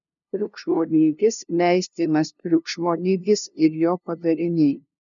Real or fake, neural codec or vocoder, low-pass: fake; codec, 16 kHz, 0.5 kbps, FunCodec, trained on LibriTTS, 25 frames a second; 7.2 kHz